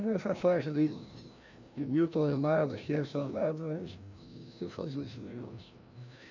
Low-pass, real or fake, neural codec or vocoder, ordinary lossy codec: 7.2 kHz; fake; codec, 16 kHz, 1 kbps, FreqCodec, larger model; none